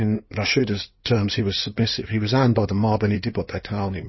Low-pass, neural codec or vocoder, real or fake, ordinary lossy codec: 7.2 kHz; codec, 16 kHz in and 24 kHz out, 2.2 kbps, FireRedTTS-2 codec; fake; MP3, 24 kbps